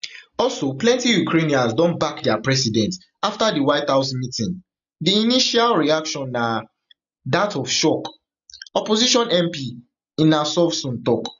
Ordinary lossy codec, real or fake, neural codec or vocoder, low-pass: none; real; none; 7.2 kHz